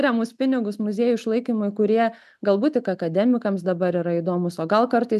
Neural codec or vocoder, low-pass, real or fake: none; 14.4 kHz; real